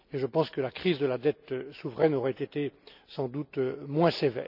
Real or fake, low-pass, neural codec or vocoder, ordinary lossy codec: real; 5.4 kHz; none; none